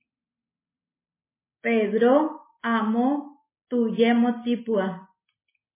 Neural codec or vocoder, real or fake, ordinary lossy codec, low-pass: none; real; MP3, 16 kbps; 3.6 kHz